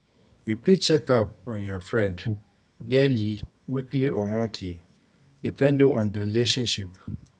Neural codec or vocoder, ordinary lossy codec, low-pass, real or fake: codec, 24 kHz, 0.9 kbps, WavTokenizer, medium music audio release; none; 10.8 kHz; fake